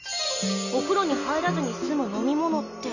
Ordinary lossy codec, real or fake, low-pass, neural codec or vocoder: none; real; 7.2 kHz; none